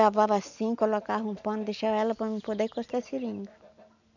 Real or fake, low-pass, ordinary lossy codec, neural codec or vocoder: real; 7.2 kHz; none; none